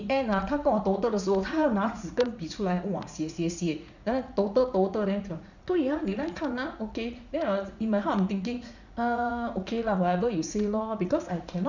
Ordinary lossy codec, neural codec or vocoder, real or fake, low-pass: none; vocoder, 44.1 kHz, 80 mel bands, Vocos; fake; 7.2 kHz